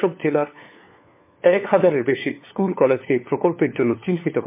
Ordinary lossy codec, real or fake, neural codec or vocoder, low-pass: MP3, 24 kbps; fake; codec, 16 kHz, 4 kbps, FunCodec, trained on LibriTTS, 50 frames a second; 3.6 kHz